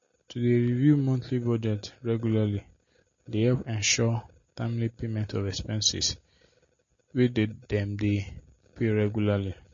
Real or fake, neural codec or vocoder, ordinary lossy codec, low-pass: real; none; MP3, 32 kbps; 7.2 kHz